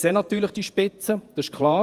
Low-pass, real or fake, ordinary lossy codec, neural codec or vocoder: 14.4 kHz; fake; Opus, 32 kbps; vocoder, 48 kHz, 128 mel bands, Vocos